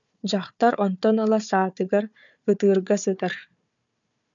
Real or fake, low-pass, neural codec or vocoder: fake; 7.2 kHz; codec, 16 kHz, 4 kbps, FunCodec, trained on Chinese and English, 50 frames a second